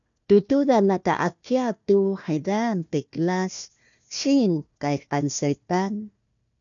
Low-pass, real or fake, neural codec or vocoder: 7.2 kHz; fake; codec, 16 kHz, 1 kbps, FunCodec, trained on Chinese and English, 50 frames a second